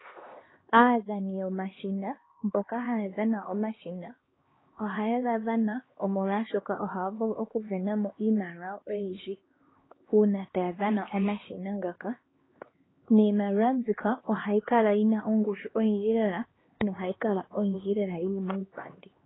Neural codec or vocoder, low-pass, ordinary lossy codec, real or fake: codec, 16 kHz, 2 kbps, X-Codec, HuBERT features, trained on LibriSpeech; 7.2 kHz; AAC, 16 kbps; fake